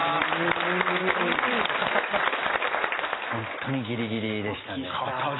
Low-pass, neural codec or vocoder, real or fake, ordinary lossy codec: 7.2 kHz; none; real; AAC, 16 kbps